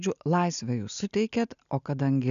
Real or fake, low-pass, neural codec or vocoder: real; 7.2 kHz; none